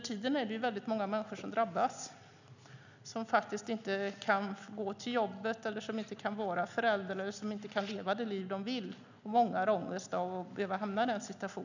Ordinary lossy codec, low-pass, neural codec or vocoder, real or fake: none; 7.2 kHz; none; real